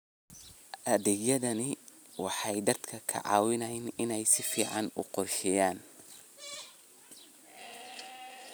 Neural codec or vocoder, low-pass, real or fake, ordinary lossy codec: none; none; real; none